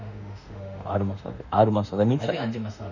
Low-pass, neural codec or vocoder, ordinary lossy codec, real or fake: 7.2 kHz; autoencoder, 48 kHz, 32 numbers a frame, DAC-VAE, trained on Japanese speech; none; fake